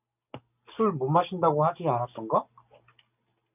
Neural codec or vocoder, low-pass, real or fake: none; 3.6 kHz; real